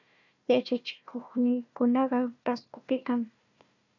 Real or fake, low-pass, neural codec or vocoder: fake; 7.2 kHz; codec, 16 kHz, 1 kbps, FunCodec, trained on Chinese and English, 50 frames a second